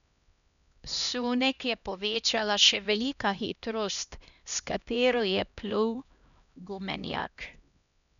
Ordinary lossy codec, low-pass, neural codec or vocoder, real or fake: none; 7.2 kHz; codec, 16 kHz, 1 kbps, X-Codec, HuBERT features, trained on LibriSpeech; fake